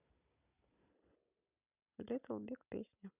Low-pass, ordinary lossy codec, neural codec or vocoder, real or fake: 3.6 kHz; none; none; real